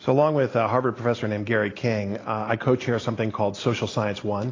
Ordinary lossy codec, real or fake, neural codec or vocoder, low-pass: AAC, 32 kbps; real; none; 7.2 kHz